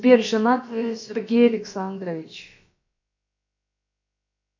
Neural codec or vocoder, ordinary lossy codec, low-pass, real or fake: codec, 16 kHz, about 1 kbps, DyCAST, with the encoder's durations; MP3, 48 kbps; 7.2 kHz; fake